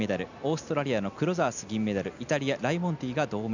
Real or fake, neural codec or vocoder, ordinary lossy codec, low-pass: real; none; none; 7.2 kHz